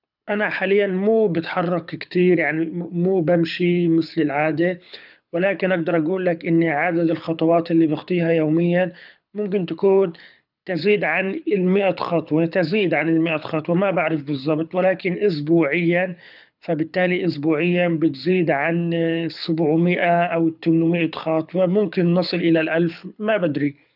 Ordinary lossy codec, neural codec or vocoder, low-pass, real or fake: none; codec, 24 kHz, 6 kbps, HILCodec; 5.4 kHz; fake